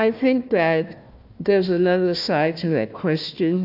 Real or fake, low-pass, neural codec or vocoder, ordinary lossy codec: fake; 5.4 kHz; codec, 16 kHz, 1 kbps, FunCodec, trained on Chinese and English, 50 frames a second; AAC, 48 kbps